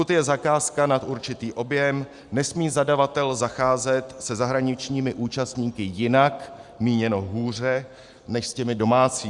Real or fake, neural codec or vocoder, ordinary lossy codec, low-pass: real; none; Opus, 64 kbps; 10.8 kHz